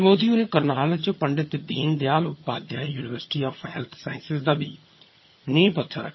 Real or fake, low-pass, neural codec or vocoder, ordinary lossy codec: fake; 7.2 kHz; vocoder, 22.05 kHz, 80 mel bands, HiFi-GAN; MP3, 24 kbps